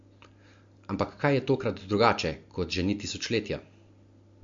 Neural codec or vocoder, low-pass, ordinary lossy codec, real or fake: none; 7.2 kHz; MP3, 48 kbps; real